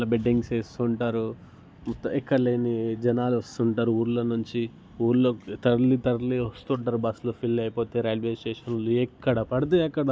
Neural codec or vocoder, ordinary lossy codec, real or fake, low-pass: none; none; real; none